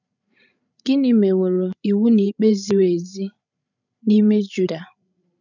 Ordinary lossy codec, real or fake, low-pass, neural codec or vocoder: none; fake; 7.2 kHz; codec, 16 kHz, 16 kbps, FreqCodec, larger model